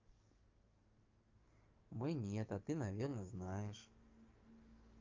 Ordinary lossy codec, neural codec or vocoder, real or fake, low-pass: Opus, 32 kbps; codec, 44.1 kHz, 7.8 kbps, DAC; fake; 7.2 kHz